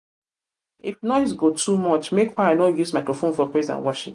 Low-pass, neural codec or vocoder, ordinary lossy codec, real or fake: 10.8 kHz; none; none; real